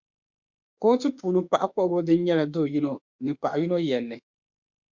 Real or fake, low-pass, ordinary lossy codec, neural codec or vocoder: fake; 7.2 kHz; Opus, 64 kbps; autoencoder, 48 kHz, 32 numbers a frame, DAC-VAE, trained on Japanese speech